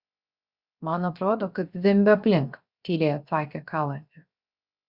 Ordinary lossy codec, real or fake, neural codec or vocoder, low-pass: Opus, 64 kbps; fake; codec, 16 kHz, 0.7 kbps, FocalCodec; 5.4 kHz